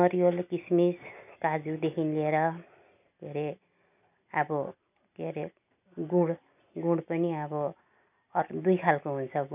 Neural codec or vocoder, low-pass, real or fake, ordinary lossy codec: none; 3.6 kHz; real; none